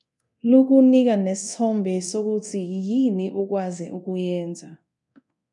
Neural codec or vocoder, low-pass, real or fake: codec, 24 kHz, 0.9 kbps, DualCodec; 10.8 kHz; fake